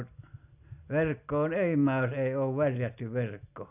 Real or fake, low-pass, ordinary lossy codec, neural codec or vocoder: real; 3.6 kHz; none; none